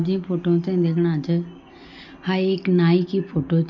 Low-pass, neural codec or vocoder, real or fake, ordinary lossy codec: 7.2 kHz; none; real; none